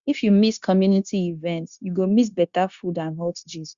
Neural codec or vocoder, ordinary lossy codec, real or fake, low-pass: codec, 16 kHz, 0.9 kbps, LongCat-Audio-Codec; Opus, 64 kbps; fake; 7.2 kHz